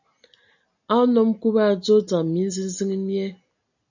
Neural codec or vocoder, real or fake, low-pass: none; real; 7.2 kHz